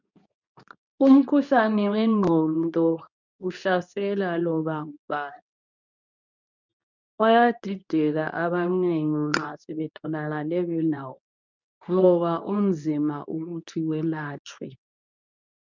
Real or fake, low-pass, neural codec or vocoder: fake; 7.2 kHz; codec, 24 kHz, 0.9 kbps, WavTokenizer, medium speech release version 2